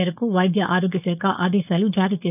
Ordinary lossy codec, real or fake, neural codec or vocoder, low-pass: none; fake; codec, 16 kHz, 4.8 kbps, FACodec; 3.6 kHz